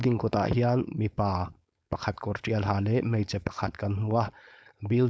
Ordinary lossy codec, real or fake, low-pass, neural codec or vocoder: none; fake; none; codec, 16 kHz, 4.8 kbps, FACodec